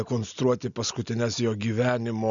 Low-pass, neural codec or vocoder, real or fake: 7.2 kHz; none; real